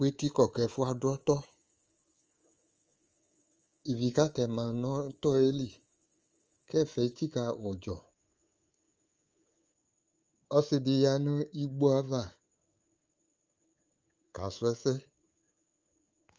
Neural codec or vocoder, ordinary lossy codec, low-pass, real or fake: codec, 24 kHz, 3.1 kbps, DualCodec; Opus, 24 kbps; 7.2 kHz; fake